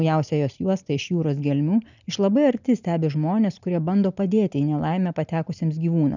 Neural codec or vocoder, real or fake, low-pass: none; real; 7.2 kHz